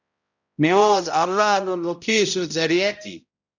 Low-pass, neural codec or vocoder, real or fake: 7.2 kHz; codec, 16 kHz, 0.5 kbps, X-Codec, HuBERT features, trained on balanced general audio; fake